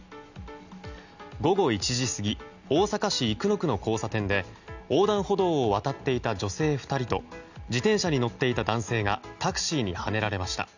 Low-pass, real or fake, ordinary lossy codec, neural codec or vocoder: 7.2 kHz; real; none; none